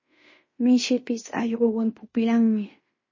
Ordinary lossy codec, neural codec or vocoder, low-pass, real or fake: MP3, 32 kbps; codec, 16 kHz in and 24 kHz out, 0.9 kbps, LongCat-Audio-Codec, fine tuned four codebook decoder; 7.2 kHz; fake